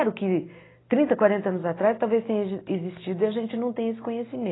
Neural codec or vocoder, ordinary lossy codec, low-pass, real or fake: none; AAC, 16 kbps; 7.2 kHz; real